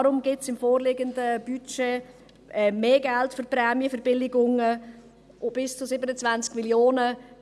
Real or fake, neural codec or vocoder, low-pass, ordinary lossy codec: real; none; none; none